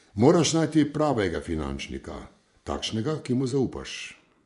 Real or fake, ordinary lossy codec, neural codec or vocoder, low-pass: fake; none; vocoder, 24 kHz, 100 mel bands, Vocos; 10.8 kHz